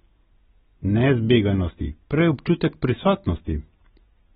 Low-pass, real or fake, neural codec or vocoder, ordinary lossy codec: 7.2 kHz; real; none; AAC, 16 kbps